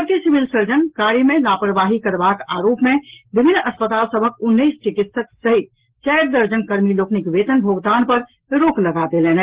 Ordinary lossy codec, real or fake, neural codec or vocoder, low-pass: Opus, 16 kbps; real; none; 3.6 kHz